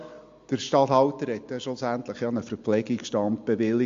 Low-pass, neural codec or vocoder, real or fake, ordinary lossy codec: 7.2 kHz; none; real; MP3, 48 kbps